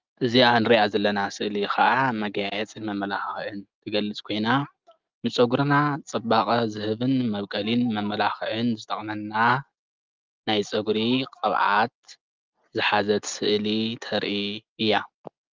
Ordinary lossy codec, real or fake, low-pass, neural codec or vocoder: Opus, 32 kbps; real; 7.2 kHz; none